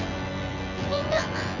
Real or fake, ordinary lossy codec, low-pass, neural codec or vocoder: fake; none; 7.2 kHz; vocoder, 24 kHz, 100 mel bands, Vocos